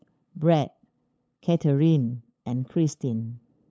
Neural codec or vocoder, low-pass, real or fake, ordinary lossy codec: codec, 16 kHz, 8 kbps, FunCodec, trained on LibriTTS, 25 frames a second; none; fake; none